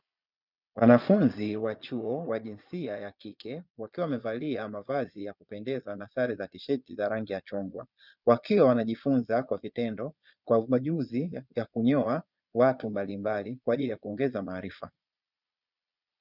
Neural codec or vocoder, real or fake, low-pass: vocoder, 22.05 kHz, 80 mel bands, Vocos; fake; 5.4 kHz